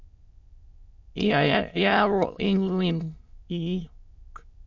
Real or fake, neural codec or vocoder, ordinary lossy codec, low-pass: fake; autoencoder, 22.05 kHz, a latent of 192 numbers a frame, VITS, trained on many speakers; MP3, 48 kbps; 7.2 kHz